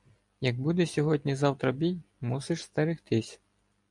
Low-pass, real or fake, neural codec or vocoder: 10.8 kHz; real; none